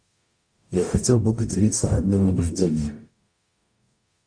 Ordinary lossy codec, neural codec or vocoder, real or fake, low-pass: AAC, 64 kbps; codec, 44.1 kHz, 0.9 kbps, DAC; fake; 9.9 kHz